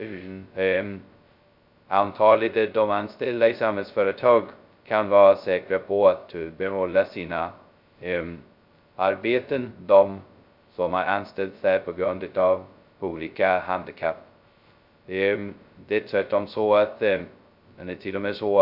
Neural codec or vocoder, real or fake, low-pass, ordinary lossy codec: codec, 16 kHz, 0.2 kbps, FocalCodec; fake; 5.4 kHz; Opus, 64 kbps